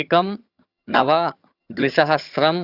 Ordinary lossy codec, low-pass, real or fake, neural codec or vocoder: Opus, 64 kbps; 5.4 kHz; fake; vocoder, 22.05 kHz, 80 mel bands, HiFi-GAN